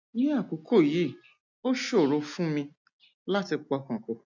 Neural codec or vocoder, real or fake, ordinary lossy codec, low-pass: none; real; MP3, 64 kbps; 7.2 kHz